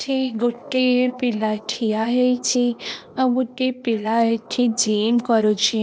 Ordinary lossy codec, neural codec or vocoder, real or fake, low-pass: none; codec, 16 kHz, 0.8 kbps, ZipCodec; fake; none